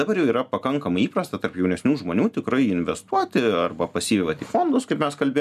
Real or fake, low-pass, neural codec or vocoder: real; 14.4 kHz; none